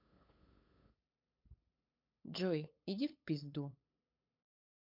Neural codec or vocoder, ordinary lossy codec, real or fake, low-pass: codec, 16 kHz, 8 kbps, FunCodec, trained on LibriTTS, 25 frames a second; MP3, 48 kbps; fake; 5.4 kHz